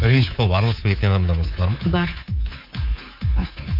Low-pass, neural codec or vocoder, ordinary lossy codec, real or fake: 5.4 kHz; codec, 24 kHz, 3.1 kbps, DualCodec; AAC, 32 kbps; fake